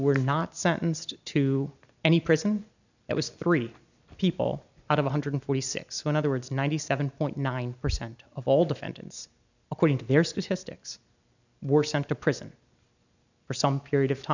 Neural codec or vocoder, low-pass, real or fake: none; 7.2 kHz; real